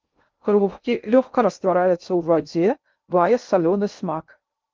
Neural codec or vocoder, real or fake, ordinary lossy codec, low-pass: codec, 16 kHz in and 24 kHz out, 0.6 kbps, FocalCodec, streaming, 2048 codes; fake; Opus, 24 kbps; 7.2 kHz